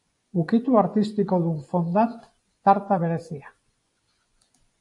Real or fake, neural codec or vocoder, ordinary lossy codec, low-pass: fake; vocoder, 24 kHz, 100 mel bands, Vocos; AAC, 64 kbps; 10.8 kHz